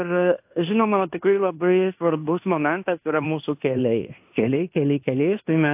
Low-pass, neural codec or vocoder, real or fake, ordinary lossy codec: 3.6 kHz; codec, 16 kHz in and 24 kHz out, 0.9 kbps, LongCat-Audio-Codec, fine tuned four codebook decoder; fake; AAC, 32 kbps